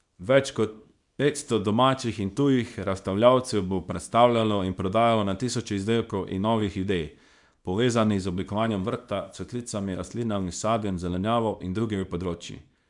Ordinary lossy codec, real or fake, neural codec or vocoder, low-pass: none; fake; codec, 24 kHz, 0.9 kbps, WavTokenizer, small release; 10.8 kHz